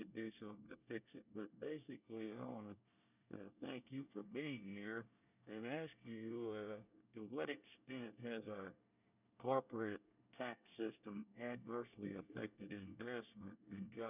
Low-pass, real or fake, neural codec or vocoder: 3.6 kHz; fake; codec, 24 kHz, 1 kbps, SNAC